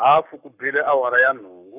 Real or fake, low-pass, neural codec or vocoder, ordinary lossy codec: fake; 3.6 kHz; codec, 44.1 kHz, 7.8 kbps, Pupu-Codec; none